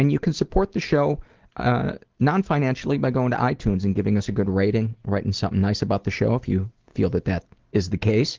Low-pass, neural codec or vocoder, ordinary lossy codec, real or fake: 7.2 kHz; none; Opus, 16 kbps; real